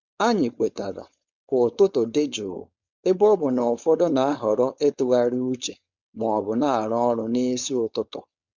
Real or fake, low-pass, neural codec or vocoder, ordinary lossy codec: fake; 7.2 kHz; codec, 16 kHz, 4.8 kbps, FACodec; Opus, 64 kbps